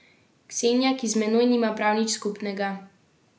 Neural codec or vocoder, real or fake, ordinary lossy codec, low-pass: none; real; none; none